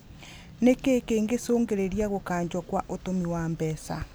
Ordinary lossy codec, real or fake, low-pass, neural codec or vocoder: none; real; none; none